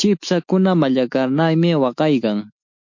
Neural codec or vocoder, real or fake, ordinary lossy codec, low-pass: autoencoder, 48 kHz, 128 numbers a frame, DAC-VAE, trained on Japanese speech; fake; MP3, 48 kbps; 7.2 kHz